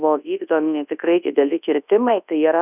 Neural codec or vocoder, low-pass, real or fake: codec, 24 kHz, 0.9 kbps, WavTokenizer, large speech release; 3.6 kHz; fake